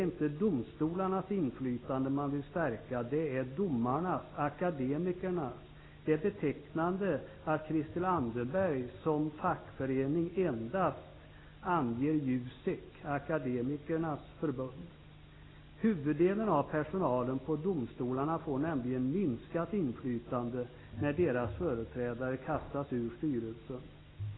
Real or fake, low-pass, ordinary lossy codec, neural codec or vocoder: real; 7.2 kHz; AAC, 16 kbps; none